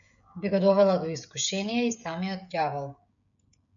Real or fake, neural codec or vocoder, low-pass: fake; codec, 16 kHz, 16 kbps, FreqCodec, smaller model; 7.2 kHz